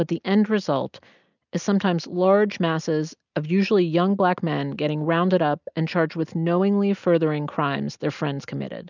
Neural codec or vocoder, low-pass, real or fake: none; 7.2 kHz; real